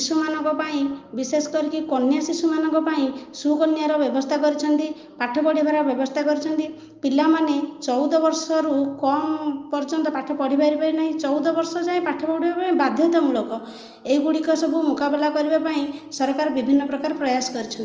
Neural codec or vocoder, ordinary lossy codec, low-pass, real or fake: none; Opus, 24 kbps; 7.2 kHz; real